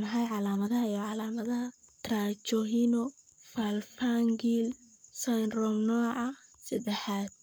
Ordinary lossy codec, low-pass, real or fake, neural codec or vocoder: none; none; fake; codec, 44.1 kHz, 7.8 kbps, Pupu-Codec